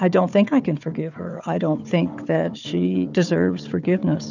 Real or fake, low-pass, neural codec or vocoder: fake; 7.2 kHz; codec, 16 kHz, 4 kbps, FunCodec, trained on Chinese and English, 50 frames a second